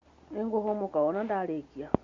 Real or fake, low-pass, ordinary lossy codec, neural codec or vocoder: real; 7.2 kHz; Opus, 64 kbps; none